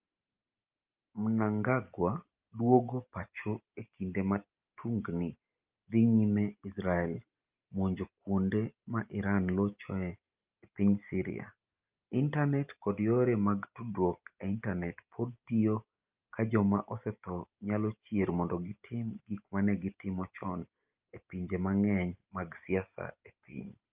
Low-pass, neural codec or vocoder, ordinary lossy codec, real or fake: 3.6 kHz; none; Opus, 24 kbps; real